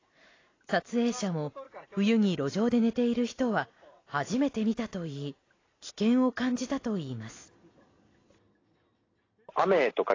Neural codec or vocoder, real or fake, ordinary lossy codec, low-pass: none; real; AAC, 32 kbps; 7.2 kHz